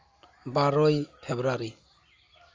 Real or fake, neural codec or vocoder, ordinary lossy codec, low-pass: real; none; Opus, 32 kbps; 7.2 kHz